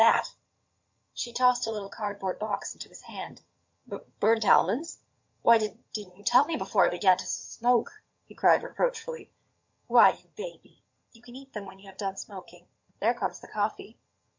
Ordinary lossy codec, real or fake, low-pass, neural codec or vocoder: MP3, 48 kbps; fake; 7.2 kHz; vocoder, 22.05 kHz, 80 mel bands, HiFi-GAN